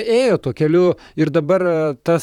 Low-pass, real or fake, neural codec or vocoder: 19.8 kHz; fake; vocoder, 44.1 kHz, 128 mel bands, Pupu-Vocoder